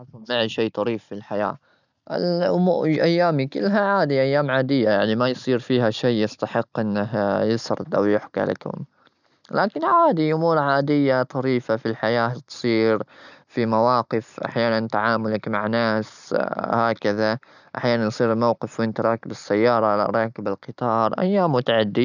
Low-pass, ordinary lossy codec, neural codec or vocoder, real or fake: 7.2 kHz; none; none; real